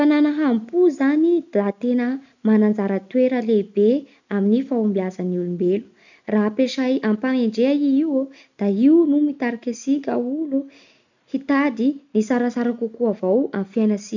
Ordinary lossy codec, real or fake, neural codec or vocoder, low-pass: none; real; none; 7.2 kHz